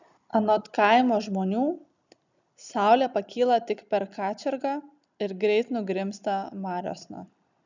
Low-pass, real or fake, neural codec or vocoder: 7.2 kHz; real; none